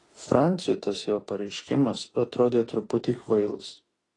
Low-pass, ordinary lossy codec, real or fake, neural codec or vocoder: 10.8 kHz; AAC, 32 kbps; fake; autoencoder, 48 kHz, 32 numbers a frame, DAC-VAE, trained on Japanese speech